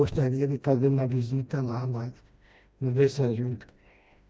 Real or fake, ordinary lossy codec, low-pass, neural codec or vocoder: fake; none; none; codec, 16 kHz, 1 kbps, FreqCodec, smaller model